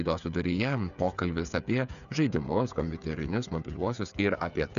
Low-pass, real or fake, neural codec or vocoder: 7.2 kHz; fake; codec, 16 kHz, 8 kbps, FreqCodec, smaller model